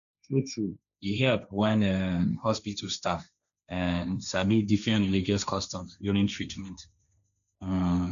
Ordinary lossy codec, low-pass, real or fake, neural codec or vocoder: none; 7.2 kHz; fake; codec, 16 kHz, 1.1 kbps, Voila-Tokenizer